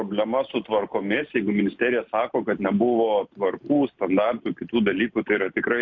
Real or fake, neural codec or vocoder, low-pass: real; none; 7.2 kHz